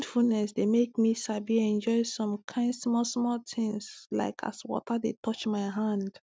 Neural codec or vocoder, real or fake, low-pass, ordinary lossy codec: none; real; none; none